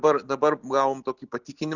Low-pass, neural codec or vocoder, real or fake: 7.2 kHz; none; real